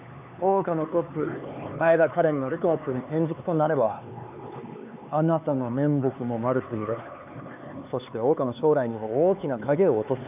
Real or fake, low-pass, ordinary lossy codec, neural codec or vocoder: fake; 3.6 kHz; none; codec, 16 kHz, 4 kbps, X-Codec, HuBERT features, trained on LibriSpeech